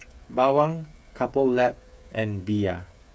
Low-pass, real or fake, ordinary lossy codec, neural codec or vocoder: none; fake; none; codec, 16 kHz, 8 kbps, FreqCodec, smaller model